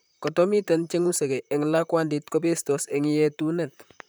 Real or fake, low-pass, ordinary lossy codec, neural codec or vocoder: fake; none; none; vocoder, 44.1 kHz, 128 mel bands, Pupu-Vocoder